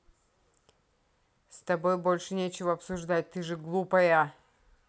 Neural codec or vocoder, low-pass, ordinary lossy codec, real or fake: none; none; none; real